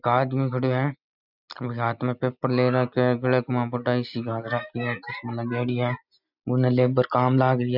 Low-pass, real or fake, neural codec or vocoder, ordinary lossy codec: 5.4 kHz; real; none; none